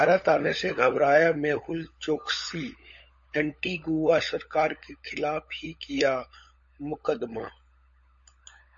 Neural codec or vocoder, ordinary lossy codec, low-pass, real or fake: codec, 16 kHz, 16 kbps, FunCodec, trained on LibriTTS, 50 frames a second; MP3, 32 kbps; 7.2 kHz; fake